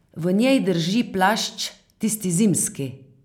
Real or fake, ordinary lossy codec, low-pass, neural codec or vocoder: real; none; 19.8 kHz; none